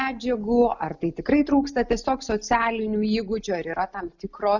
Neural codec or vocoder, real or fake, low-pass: none; real; 7.2 kHz